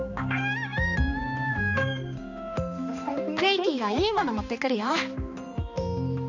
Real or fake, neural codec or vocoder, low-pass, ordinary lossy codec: fake; codec, 16 kHz, 2 kbps, X-Codec, HuBERT features, trained on balanced general audio; 7.2 kHz; none